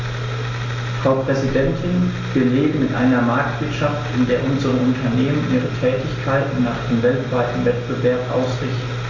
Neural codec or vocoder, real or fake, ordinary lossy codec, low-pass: none; real; AAC, 32 kbps; 7.2 kHz